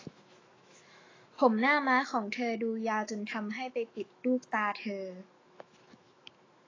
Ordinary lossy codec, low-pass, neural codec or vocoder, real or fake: AAC, 32 kbps; 7.2 kHz; autoencoder, 48 kHz, 128 numbers a frame, DAC-VAE, trained on Japanese speech; fake